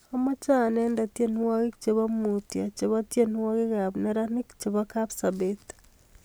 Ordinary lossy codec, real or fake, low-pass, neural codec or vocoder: none; real; none; none